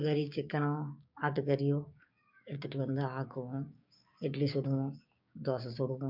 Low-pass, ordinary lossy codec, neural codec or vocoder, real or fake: 5.4 kHz; MP3, 48 kbps; codec, 16 kHz, 6 kbps, DAC; fake